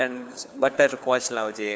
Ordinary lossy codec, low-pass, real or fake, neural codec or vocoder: none; none; fake; codec, 16 kHz, 4 kbps, FunCodec, trained on LibriTTS, 50 frames a second